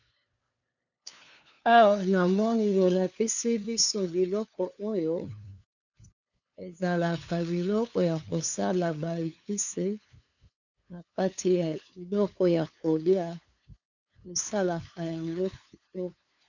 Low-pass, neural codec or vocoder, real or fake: 7.2 kHz; codec, 16 kHz, 2 kbps, FunCodec, trained on LibriTTS, 25 frames a second; fake